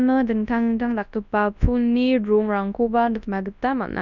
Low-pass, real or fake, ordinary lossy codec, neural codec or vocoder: 7.2 kHz; fake; none; codec, 24 kHz, 0.9 kbps, WavTokenizer, large speech release